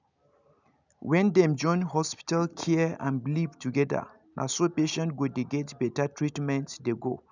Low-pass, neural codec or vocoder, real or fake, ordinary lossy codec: 7.2 kHz; none; real; none